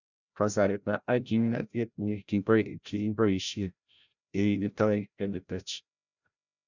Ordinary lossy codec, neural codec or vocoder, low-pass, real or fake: none; codec, 16 kHz, 0.5 kbps, FreqCodec, larger model; 7.2 kHz; fake